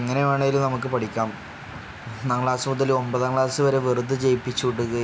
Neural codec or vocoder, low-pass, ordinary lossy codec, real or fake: none; none; none; real